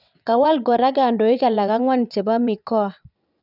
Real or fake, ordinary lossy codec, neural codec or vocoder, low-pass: fake; none; vocoder, 22.05 kHz, 80 mel bands, WaveNeXt; 5.4 kHz